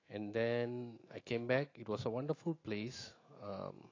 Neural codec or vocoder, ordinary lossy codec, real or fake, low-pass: autoencoder, 48 kHz, 128 numbers a frame, DAC-VAE, trained on Japanese speech; AAC, 32 kbps; fake; 7.2 kHz